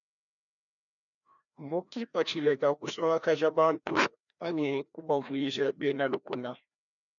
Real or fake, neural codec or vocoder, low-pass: fake; codec, 16 kHz, 1 kbps, FreqCodec, larger model; 7.2 kHz